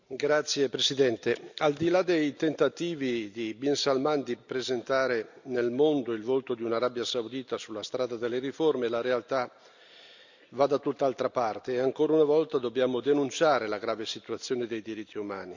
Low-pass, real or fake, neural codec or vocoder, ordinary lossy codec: 7.2 kHz; real; none; none